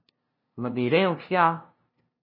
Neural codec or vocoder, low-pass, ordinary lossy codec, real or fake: codec, 16 kHz, 0.5 kbps, FunCodec, trained on LibriTTS, 25 frames a second; 5.4 kHz; MP3, 24 kbps; fake